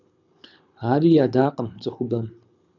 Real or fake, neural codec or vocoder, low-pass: fake; codec, 24 kHz, 6 kbps, HILCodec; 7.2 kHz